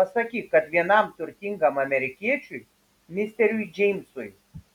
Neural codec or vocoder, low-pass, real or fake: none; 19.8 kHz; real